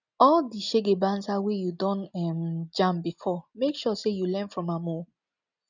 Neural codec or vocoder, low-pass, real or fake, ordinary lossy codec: none; 7.2 kHz; real; none